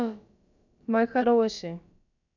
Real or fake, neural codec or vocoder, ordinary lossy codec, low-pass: fake; codec, 16 kHz, about 1 kbps, DyCAST, with the encoder's durations; none; 7.2 kHz